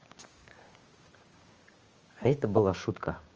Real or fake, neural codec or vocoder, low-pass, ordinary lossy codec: fake; codec, 16 kHz, 6 kbps, DAC; 7.2 kHz; Opus, 24 kbps